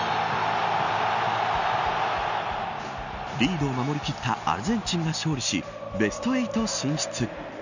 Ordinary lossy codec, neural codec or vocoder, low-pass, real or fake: none; none; 7.2 kHz; real